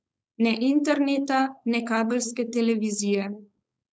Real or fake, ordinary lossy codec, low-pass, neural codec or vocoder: fake; none; none; codec, 16 kHz, 4.8 kbps, FACodec